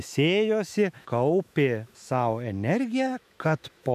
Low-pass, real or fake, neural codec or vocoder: 14.4 kHz; fake; autoencoder, 48 kHz, 128 numbers a frame, DAC-VAE, trained on Japanese speech